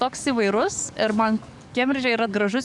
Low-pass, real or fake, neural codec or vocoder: 10.8 kHz; fake; codec, 44.1 kHz, 7.8 kbps, DAC